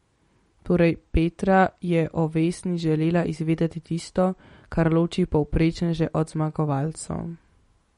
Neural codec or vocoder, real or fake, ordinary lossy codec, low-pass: none; real; MP3, 48 kbps; 19.8 kHz